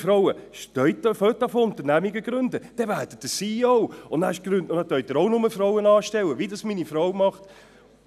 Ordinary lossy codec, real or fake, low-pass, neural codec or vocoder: none; real; 14.4 kHz; none